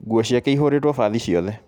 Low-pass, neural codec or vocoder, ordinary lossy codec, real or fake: 19.8 kHz; none; none; real